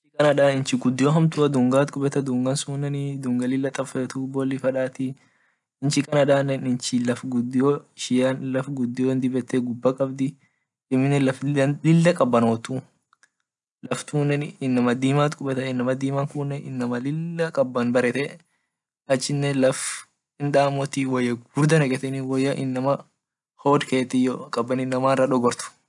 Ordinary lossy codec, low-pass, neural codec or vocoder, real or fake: none; 10.8 kHz; none; real